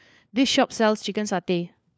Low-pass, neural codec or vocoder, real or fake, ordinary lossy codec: none; codec, 16 kHz, 4 kbps, FunCodec, trained on LibriTTS, 50 frames a second; fake; none